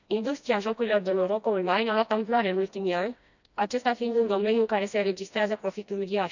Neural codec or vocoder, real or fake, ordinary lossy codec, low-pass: codec, 16 kHz, 1 kbps, FreqCodec, smaller model; fake; none; 7.2 kHz